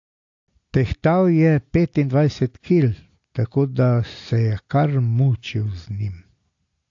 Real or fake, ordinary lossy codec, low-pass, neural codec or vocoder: real; AAC, 48 kbps; 7.2 kHz; none